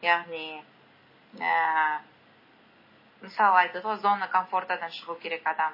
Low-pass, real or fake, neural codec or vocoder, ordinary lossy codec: 5.4 kHz; real; none; MP3, 24 kbps